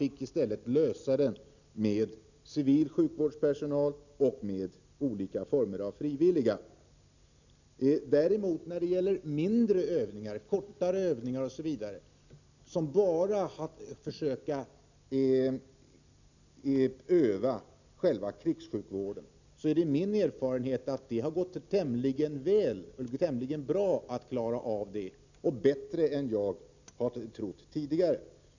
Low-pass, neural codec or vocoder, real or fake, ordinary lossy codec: 7.2 kHz; none; real; none